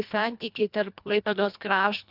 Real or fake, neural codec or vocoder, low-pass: fake; codec, 24 kHz, 1.5 kbps, HILCodec; 5.4 kHz